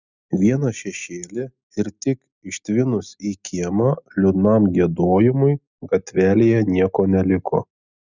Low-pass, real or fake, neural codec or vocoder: 7.2 kHz; real; none